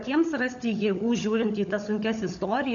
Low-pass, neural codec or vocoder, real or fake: 7.2 kHz; codec, 16 kHz, 16 kbps, FunCodec, trained on LibriTTS, 50 frames a second; fake